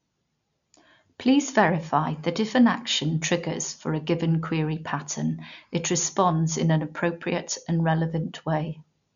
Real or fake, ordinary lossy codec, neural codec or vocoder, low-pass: real; none; none; 7.2 kHz